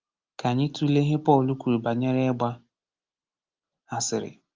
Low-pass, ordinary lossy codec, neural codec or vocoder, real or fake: 7.2 kHz; Opus, 32 kbps; none; real